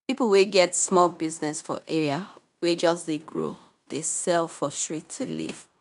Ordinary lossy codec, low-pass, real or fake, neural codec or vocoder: none; 10.8 kHz; fake; codec, 16 kHz in and 24 kHz out, 0.9 kbps, LongCat-Audio-Codec, fine tuned four codebook decoder